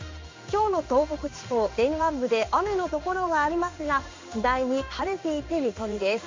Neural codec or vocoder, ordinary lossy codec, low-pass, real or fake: codec, 16 kHz in and 24 kHz out, 1 kbps, XY-Tokenizer; MP3, 48 kbps; 7.2 kHz; fake